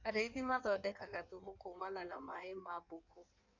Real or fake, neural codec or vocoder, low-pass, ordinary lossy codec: fake; codec, 16 kHz in and 24 kHz out, 1.1 kbps, FireRedTTS-2 codec; 7.2 kHz; none